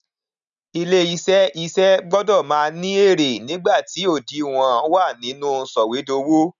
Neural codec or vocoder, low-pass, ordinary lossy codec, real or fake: none; 7.2 kHz; none; real